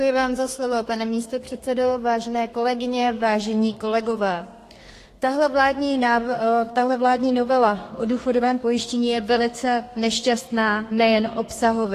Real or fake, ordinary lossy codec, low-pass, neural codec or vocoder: fake; AAC, 48 kbps; 14.4 kHz; codec, 32 kHz, 1.9 kbps, SNAC